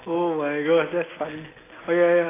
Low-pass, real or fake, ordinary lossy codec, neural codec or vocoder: 3.6 kHz; real; AAC, 16 kbps; none